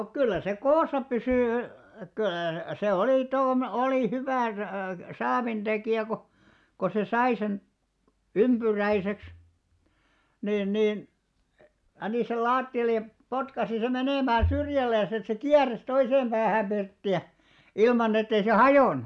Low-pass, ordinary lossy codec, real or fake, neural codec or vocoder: none; none; real; none